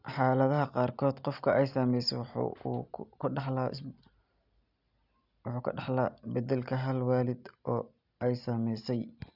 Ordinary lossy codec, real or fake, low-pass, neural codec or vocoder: none; real; 5.4 kHz; none